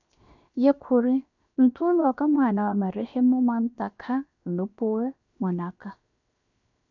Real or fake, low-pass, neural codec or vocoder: fake; 7.2 kHz; codec, 16 kHz, 0.7 kbps, FocalCodec